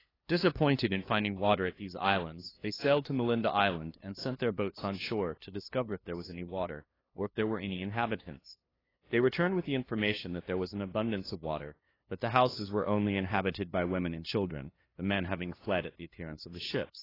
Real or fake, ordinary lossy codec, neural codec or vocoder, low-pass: fake; AAC, 24 kbps; codec, 16 kHz, 2 kbps, FunCodec, trained on LibriTTS, 25 frames a second; 5.4 kHz